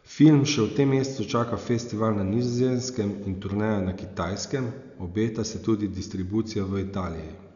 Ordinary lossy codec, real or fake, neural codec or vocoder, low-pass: none; real; none; 7.2 kHz